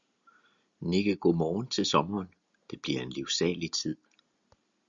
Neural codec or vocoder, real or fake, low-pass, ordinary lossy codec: none; real; 7.2 kHz; Opus, 64 kbps